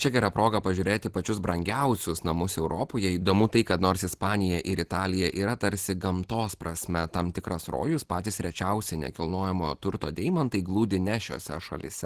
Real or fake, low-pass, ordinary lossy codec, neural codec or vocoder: real; 14.4 kHz; Opus, 16 kbps; none